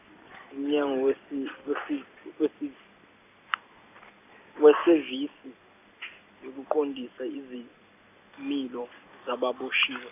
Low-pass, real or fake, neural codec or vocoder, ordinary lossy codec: 3.6 kHz; real; none; none